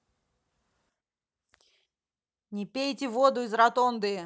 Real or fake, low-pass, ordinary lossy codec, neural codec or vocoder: real; none; none; none